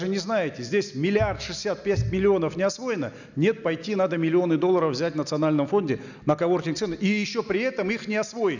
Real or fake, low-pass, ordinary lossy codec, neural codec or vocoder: real; 7.2 kHz; none; none